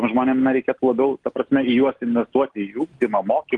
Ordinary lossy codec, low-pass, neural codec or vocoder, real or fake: Opus, 24 kbps; 10.8 kHz; none; real